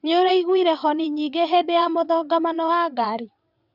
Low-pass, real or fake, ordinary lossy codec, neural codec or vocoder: 5.4 kHz; fake; Opus, 64 kbps; vocoder, 22.05 kHz, 80 mel bands, WaveNeXt